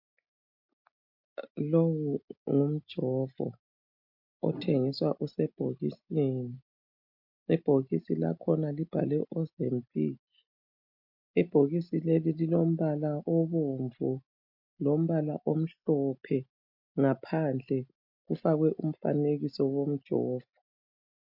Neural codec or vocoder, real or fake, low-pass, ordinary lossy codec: none; real; 5.4 kHz; MP3, 48 kbps